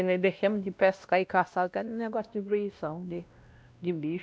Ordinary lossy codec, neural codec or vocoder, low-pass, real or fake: none; codec, 16 kHz, 1 kbps, X-Codec, WavLM features, trained on Multilingual LibriSpeech; none; fake